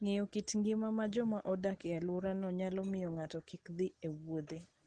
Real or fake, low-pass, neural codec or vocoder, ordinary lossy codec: real; 9.9 kHz; none; Opus, 16 kbps